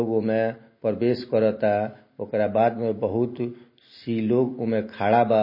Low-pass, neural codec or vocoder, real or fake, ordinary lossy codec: 5.4 kHz; none; real; MP3, 24 kbps